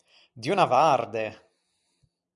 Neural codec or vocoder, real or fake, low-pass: vocoder, 44.1 kHz, 128 mel bands every 256 samples, BigVGAN v2; fake; 10.8 kHz